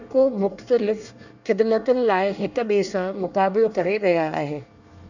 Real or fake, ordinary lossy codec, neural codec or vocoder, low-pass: fake; none; codec, 24 kHz, 1 kbps, SNAC; 7.2 kHz